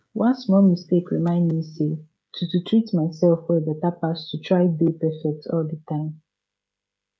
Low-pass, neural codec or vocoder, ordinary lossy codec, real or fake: none; codec, 16 kHz, 16 kbps, FreqCodec, smaller model; none; fake